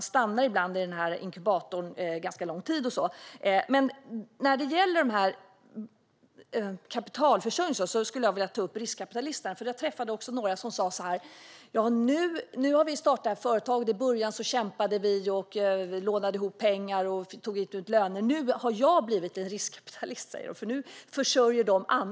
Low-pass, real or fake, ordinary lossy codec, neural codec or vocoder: none; real; none; none